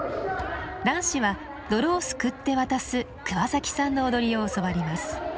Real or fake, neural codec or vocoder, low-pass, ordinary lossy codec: real; none; none; none